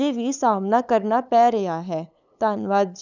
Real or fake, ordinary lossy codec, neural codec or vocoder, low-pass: fake; none; codec, 16 kHz, 8 kbps, FunCodec, trained on LibriTTS, 25 frames a second; 7.2 kHz